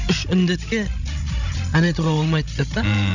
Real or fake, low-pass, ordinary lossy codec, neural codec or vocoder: fake; 7.2 kHz; none; codec, 16 kHz, 16 kbps, FreqCodec, larger model